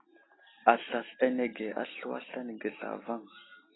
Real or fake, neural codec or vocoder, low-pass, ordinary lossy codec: real; none; 7.2 kHz; AAC, 16 kbps